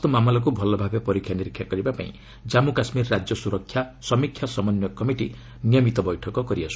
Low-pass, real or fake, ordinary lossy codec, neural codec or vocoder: none; real; none; none